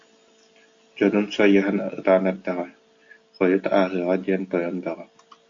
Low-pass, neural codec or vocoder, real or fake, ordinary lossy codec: 7.2 kHz; none; real; AAC, 48 kbps